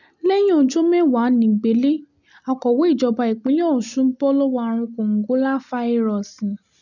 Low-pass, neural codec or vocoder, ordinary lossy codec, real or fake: 7.2 kHz; none; none; real